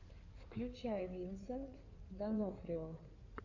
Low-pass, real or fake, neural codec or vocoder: 7.2 kHz; fake; codec, 16 kHz in and 24 kHz out, 2.2 kbps, FireRedTTS-2 codec